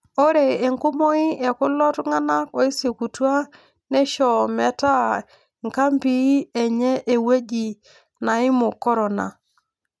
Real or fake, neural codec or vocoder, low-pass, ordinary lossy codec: real; none; none; none